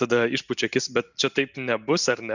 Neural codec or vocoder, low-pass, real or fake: none; 7.2 kHz; real